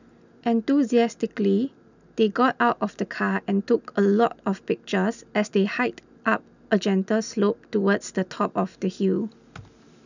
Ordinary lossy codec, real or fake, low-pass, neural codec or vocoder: none; real; 7.2 kHz; none